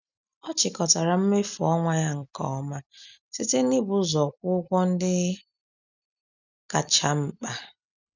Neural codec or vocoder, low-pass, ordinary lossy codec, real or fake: none; 7.2 kHz; none; real